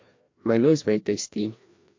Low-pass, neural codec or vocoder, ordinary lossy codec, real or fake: 7.2 kHz; codec, 16 kHz, 1 kbps, FreqCodec, larger model; MP3, 48 kbps; fake